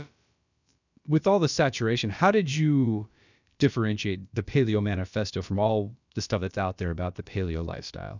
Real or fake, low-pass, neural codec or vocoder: fake; 7.2 kHz; codec, 16 kHz, about 1 kbps, DyCAST, with the encoder's durations